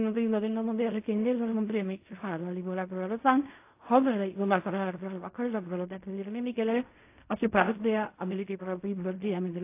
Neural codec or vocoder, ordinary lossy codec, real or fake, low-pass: codec, 16 kHz in and 24 kHz out, 0.4 kbps, LongCat-Audio-Codec, fine tuned four codebook decoder; AAC, 24 kbps; fake; 3.6 kHz